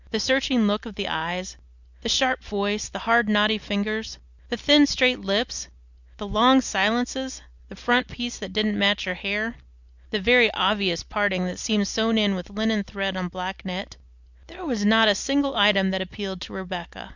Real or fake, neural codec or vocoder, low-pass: real; none; 7.2 kHz